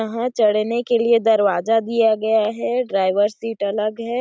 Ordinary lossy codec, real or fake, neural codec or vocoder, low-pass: none; real; none; none